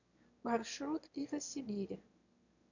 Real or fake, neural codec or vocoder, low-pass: fake; autoencoder, 22.05 kHz, a latent of 192 numbers a frame, VITS, trained on one speaker; 7.2 kHz